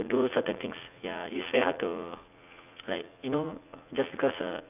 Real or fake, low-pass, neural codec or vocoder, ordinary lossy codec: fake; 3.6 kHz; vocoder, 44.1 kHz, 80 mel bands, Vocos; none